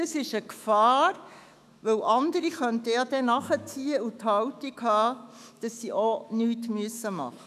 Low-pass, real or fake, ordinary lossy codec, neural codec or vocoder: 14.4 kHz; fake; none; autoencoder, 48 kHz, 128 numbers a frame, DAC-VAE, trained on Japanese speech